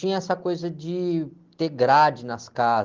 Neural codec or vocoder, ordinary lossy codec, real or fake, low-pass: none; Opus, 16 kbps; real; 7.2 kHz